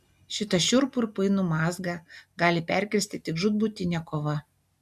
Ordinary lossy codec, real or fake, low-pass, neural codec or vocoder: MP3, 96 kbps; real; 14.4 kHz; none